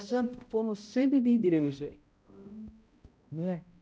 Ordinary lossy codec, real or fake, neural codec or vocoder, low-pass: none; fake; codec, 16 kHz, 0.5 kbps, X-Codec, HuBERT features, trained on balanced general audio; none